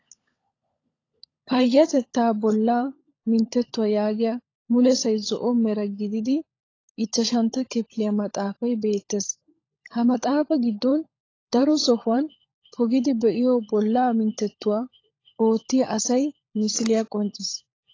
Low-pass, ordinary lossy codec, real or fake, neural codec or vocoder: 7.2 kHz; AAC, 32 kbps; fake; codec, 16 kHz, 16 kbps, FunCodec, trained on LibriTTS, 50 frames a second